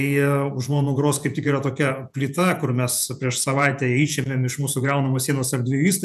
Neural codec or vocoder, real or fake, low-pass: vocoder, 48 kHz, 128 mel bands, Vocos; fake; 14.4 kHz